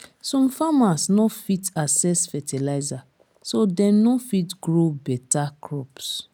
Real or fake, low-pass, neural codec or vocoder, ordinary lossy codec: real; 19.8 kHz; none; none